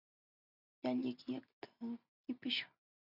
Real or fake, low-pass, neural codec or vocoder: real; 5.4 kHz; none